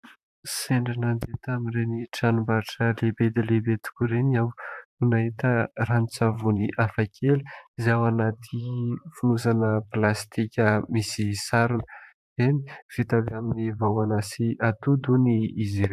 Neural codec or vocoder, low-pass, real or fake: vocoder, 44.1 kHz, 128 mel bands, Pupu-Vocoder; 14.4 kHz; fake